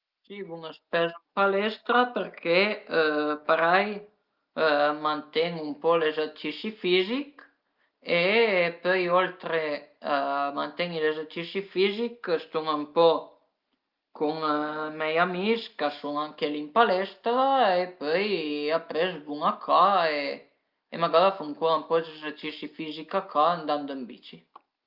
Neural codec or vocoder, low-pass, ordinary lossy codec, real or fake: none; 5.4 kHz; Opus, 24 kbps; real